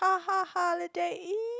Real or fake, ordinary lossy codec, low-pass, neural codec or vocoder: real; none; none; none